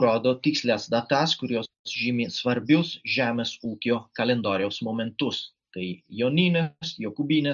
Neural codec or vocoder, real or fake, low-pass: none; real; 7.2 kHz